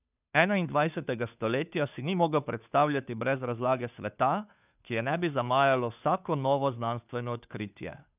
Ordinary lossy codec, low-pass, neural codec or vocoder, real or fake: none; 3.6 kHz; codec, 16 kHz, 2 kbps, FunCodec, trained on Chinese and English, 25 frames a second; fake